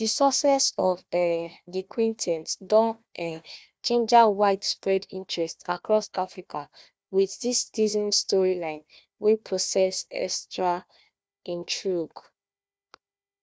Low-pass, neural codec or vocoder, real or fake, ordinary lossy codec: none; codec, 16 kHz, 1 kbps, FunCodec, trained on Chinese and English, 50 frames a second; fake; none